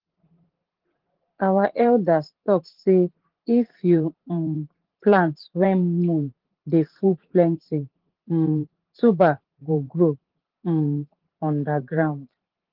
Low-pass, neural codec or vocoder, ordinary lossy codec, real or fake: 5.4 kHz; vocoder, 44.1 kHz, 80 mel bands, Vocos; Opus, 16 kbps; fake